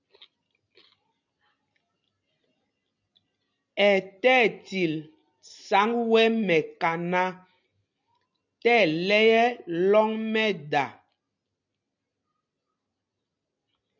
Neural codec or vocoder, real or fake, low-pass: none; real; 7.2 kHz